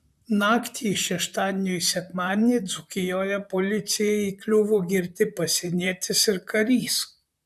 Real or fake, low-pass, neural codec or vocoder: fake; 14.4 kHz; vocoder, 44.1 kHz, 128 mel bands, Pupu-Vocoder